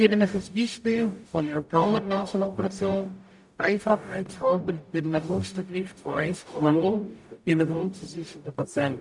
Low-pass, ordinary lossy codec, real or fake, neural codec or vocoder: 10.8 kHz; MP3, 96 kbps; fake; codec, 44.1 kHz, 0.9 kbps, DAC